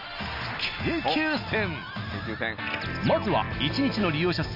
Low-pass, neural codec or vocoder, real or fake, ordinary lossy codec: 5.4 kHz; none; real; none